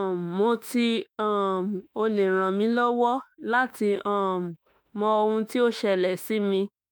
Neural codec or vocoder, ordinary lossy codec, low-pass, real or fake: autoencoder, 48 kHz, 32 numbers a frame, DAC-VAE, trained on Japanese speech; none; none; fake